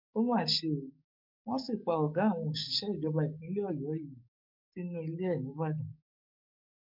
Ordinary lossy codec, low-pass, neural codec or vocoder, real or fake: MP3, 48 kbps; 5.4 kHz; codec, 44.1 kHz, 7.8 kbps, DAC; fake